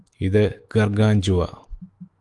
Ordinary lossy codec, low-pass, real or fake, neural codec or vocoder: Opus, 24 kbps; 10.8 kHz; fake; autoencoder, 48 kHz, 128 numbers a frame, DAC-VAE, trained on Japanese speech